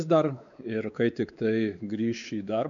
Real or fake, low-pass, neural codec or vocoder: fake; 7.2 kHz; codec, 16 kHz, 4 kbps, X-Codec, WavLM features, trained on Multilingual LibriSpeech